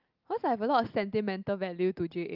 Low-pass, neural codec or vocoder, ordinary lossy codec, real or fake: 5.4 kHz; none; Opus, 24 kbps; real